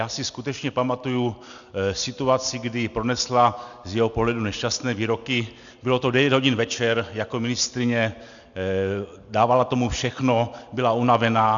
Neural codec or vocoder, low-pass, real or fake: none; 7.2 kHz; real